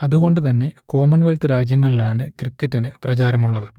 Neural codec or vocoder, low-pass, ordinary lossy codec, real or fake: codec, 44.1 kHz, 2.6 kbps, DAC; 19.8 kHz; none; fake